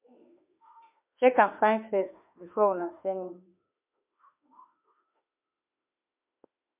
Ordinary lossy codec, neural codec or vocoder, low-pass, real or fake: MP3, 32 kbps; autoencoder, 48 kHz, 32 numbers a frame, DAC-VAE, trained on Japanese speech; 3.6 kHz; fake